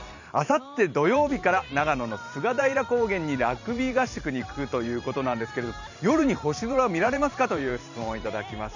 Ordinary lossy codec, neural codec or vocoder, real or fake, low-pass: none; vocoder, 44.1 kHz, 128 mel bands every 512 samples, BigVGAN v2; fake; 7.2 kHz